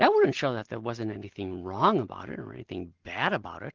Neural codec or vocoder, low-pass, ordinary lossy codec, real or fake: none; 7.2 kHz; Opus, 16 kbps; real